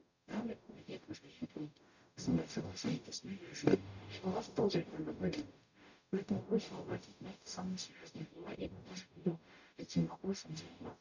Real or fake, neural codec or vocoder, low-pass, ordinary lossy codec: fake; codec, 44.1 kHz, 0.9 kbps, DAC; 7.2 kHz; none